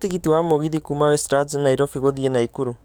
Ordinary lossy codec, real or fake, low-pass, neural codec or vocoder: none; fake; none; codec, 44.1 kHz, 7.8 kbps, DAC